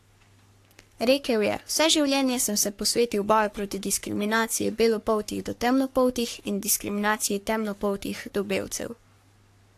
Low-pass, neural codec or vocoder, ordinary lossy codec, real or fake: 14.4 kHz; codec, 44.1 kHz, 3.4 kbps, Pupu-Codec; AAC, 64 kbps; fake